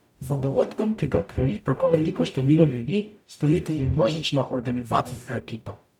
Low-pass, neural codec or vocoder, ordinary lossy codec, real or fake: 19.8 kHz; codec, 44.1 kHz, 0.9 kbps, DAC; none; fake